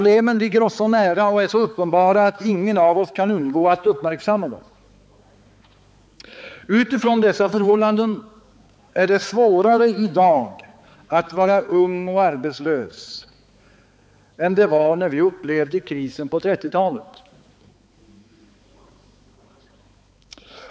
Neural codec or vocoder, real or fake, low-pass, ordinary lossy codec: codec, 16 kHz, 4 kbps, X-Codec, HuBERT features, trained on balanced general audio; fake; none; none